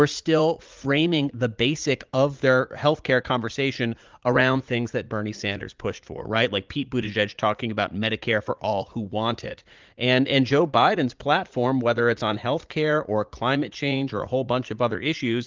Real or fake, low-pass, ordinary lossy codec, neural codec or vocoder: fake; 7.2 kHz; Opus, 24 kbps; vocoder, 44.1 kHz, 80 mel bands, Vocos